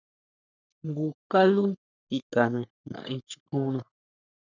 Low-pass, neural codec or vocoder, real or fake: 7.2 kHz; codec, 44.1 kHz, 3.4 kbps, Pupu-Codec; fake